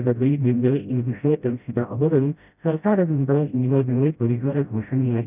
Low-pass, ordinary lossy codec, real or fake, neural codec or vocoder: 3.6 kHz; none; fake; codec, 16 kHz, 0.5 kbps, FreqCodec, smaller model